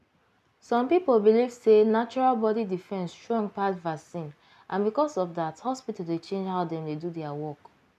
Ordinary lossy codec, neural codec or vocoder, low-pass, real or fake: none; none; 14.4 kHz; real